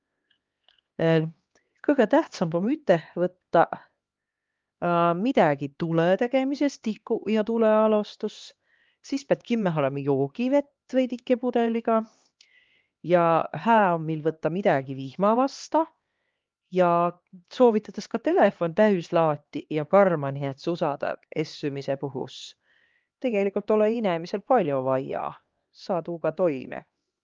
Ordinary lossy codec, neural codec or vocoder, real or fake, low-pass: Opus, 32 kbps; codec, 16 kHz, 2 kbps, X-Codec, HuBERT features, trained on LibriSpeech; fake; 7.2 kHz